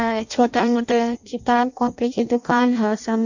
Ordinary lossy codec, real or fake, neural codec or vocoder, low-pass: none; fake; codec, 16 kHz in and 24 kHz out, 0.6 kbps, FireRedTTS-2 codec; 7.2 kHz